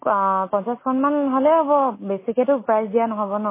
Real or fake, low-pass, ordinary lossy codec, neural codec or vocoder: real; 3.6 kHz; MP3, 16 kbps; none